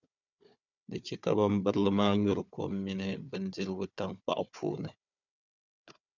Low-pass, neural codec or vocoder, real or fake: 7.2 kHz; codec, 16 kHz, 4 kbps, FunCodec, trained on Chinese and English, 50 frames a second; fake